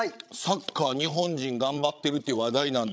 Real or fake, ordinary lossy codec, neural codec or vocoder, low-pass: fake; none; codec, 16 kHz, 16 kbps, FreqCodec, larger model; none